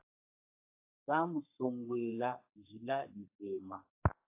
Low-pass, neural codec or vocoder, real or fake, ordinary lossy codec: 3.6 kHz; codec, 32 kHz, 1.9 kbps, SNAC; fake; AAC, 32 kbps